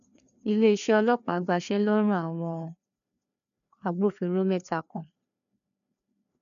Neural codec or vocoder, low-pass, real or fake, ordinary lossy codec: codec, 16 kHz, 2 kbps, FreqCodec, larger model; 7.2 kHz; fake; none